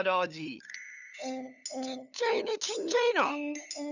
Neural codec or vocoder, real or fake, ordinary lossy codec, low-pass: codec, 16 kHz, 8 kbps, FunCodec, trained on LibriTTS, 25 frames a second; fake; none; 7.2 kHz